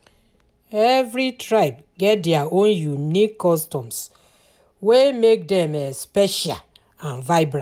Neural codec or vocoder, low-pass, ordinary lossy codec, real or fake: none; 19.8 kHz; none; real